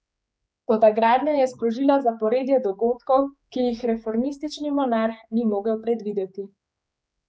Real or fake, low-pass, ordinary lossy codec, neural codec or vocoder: fake; none; none; codec, 16 kHz, 4 kbps, X-Codec, HuBERT features, trained on general audio